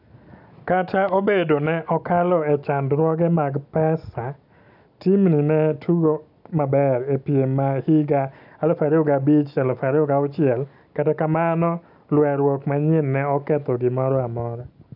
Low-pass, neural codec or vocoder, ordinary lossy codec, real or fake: 5.4 kHz; none; none; real